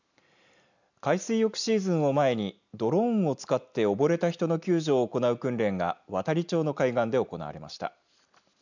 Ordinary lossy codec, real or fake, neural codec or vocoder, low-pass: none; real; none; 7.2 kHz